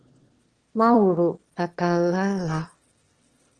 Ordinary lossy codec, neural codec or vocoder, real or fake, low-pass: Opus, 16 kbps; autoencoder, 22.05 kHz, a latent of 192 numbers a frame, VITS, trained on one speaker; fake; 9.9 kHz